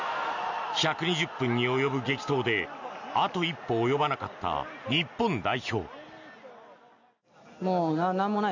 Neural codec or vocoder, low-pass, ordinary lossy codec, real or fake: none; 7.2 kHz; none; real